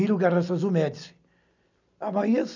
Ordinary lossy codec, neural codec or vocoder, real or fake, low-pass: none; none; real; 7.2 kHz